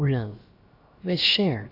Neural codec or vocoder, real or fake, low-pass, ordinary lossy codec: codec, 16 kHz, about 1 kbps, DyCAST, with the encoder's durations; fake; 5.4 kHz; AAC, 32 kbps